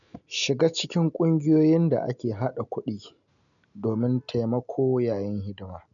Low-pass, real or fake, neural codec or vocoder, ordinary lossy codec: 7.2 kHz; real; none; none